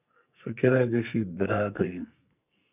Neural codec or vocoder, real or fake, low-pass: codec, 44.1 kHz, 2.6 kbps, SNAC; fake; 3.6 kHz